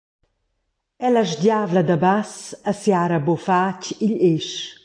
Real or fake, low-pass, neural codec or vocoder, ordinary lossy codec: real; 9.9 kHz; none; AAC, 48 kbps